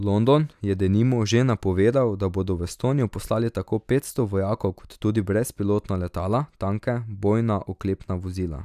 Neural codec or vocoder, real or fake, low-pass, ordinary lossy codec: none; real; 14.4 kHz; none